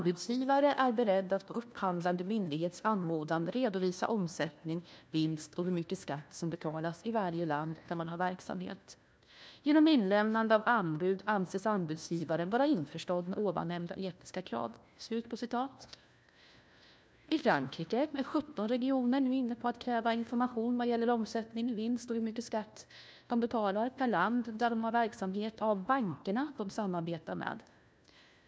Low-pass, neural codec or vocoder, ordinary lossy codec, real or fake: none; codec, 16 kHz, 1 kbps, FunCodec, trained on LibriTTS, 50 frames a second; none; fake